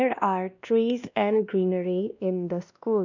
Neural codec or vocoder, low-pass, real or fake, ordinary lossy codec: codec, 16 kHz, 1 kbps, X-Codec, WavLM features, trained on Multilingual LibriSpeech; 7.2 kHz; fake; none